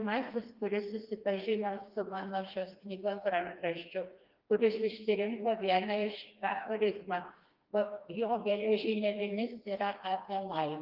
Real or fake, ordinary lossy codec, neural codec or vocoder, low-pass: fake; Opus, 32 kbps; codec, 16 kHz, 2 kbps, FreqCodec, smaller model; 5.4 kHz